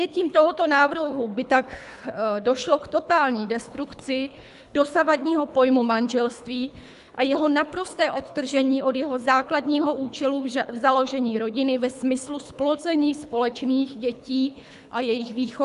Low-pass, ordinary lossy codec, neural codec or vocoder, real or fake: 10.8 kHz; AAC, 96 kbps; codec, 24 kHz, 3 kbps, HILCodec; fake